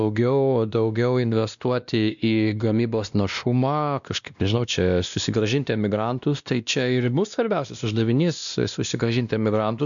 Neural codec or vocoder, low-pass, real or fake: codec, 16 kHz, 1 kbps, X-Codec, WavLM features, trained on Multilingual LibriSpeech; 7.2 kHz; fake